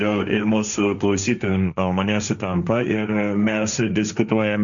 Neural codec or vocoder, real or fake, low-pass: codec, 16 kHz, 1.1 kbps, Voila-Tokenizer; fake; 7.2 kHz